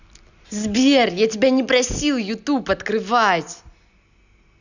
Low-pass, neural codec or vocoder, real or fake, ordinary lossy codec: 7.2 kHz; none; real; none